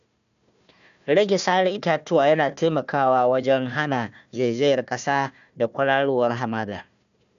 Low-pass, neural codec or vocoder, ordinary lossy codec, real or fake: 7.2 kHz; codec, 16 kHz, 1 kbps, FunCodec, trained on Chinese and English, 50 frames a second; none; fake